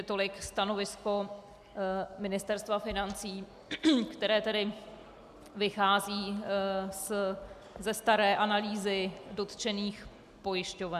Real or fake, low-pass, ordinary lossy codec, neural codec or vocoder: real; 14.4 kHz; MP3, 96 kbps; none